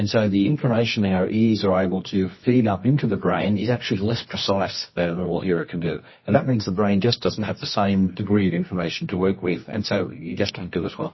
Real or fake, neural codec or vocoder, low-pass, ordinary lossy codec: fake; codec, 24 kHz, 0.9 kbps, WavTokenizer, medium music audio release; 7.2 kHz; MP3, 24 kbps